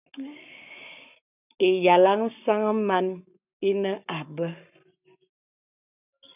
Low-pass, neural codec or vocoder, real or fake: 3.6 kHz; codec, 44.1 kHz, 7.8 kbps, Pupu-Codec; fake